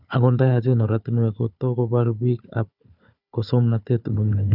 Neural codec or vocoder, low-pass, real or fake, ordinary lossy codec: codec, 16 kHz, 4 kbps, FunCodec, trained on Chinese and English, 50 frames a second; 5.4 kHz; fake; none